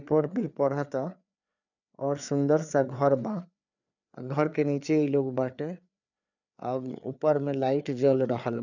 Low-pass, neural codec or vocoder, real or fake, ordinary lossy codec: 7.2 kHz; codec, 16 kHz, 4 kbps, FreqCodec, larger model; fake; none